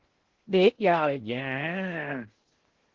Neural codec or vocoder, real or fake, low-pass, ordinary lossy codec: codec, 16 kHz in and 24 kHz out, 0.6 kbps, FocalCodec, streaming, 2048 codes; fake; 7.2 kHz; Opus, 16 kbps